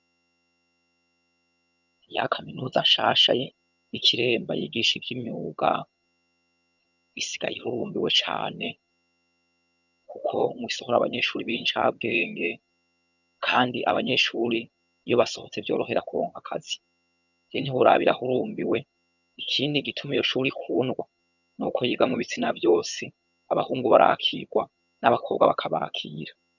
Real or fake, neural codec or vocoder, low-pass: fake; vocoder, 22.05 kHz, 80 mel bands, HiFi-GAN; 7.2 kHz